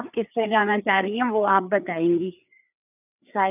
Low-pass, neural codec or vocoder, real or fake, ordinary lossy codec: 3.6 kHz; codec, 16 kHz, 4 kbps, FreqCodec, larger model; fake; none